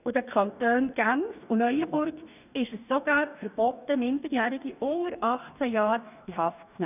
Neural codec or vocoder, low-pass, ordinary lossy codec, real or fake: codec, 44.1 kHz, 2.6 kbps, DAC; 3.6 kHz; none; fake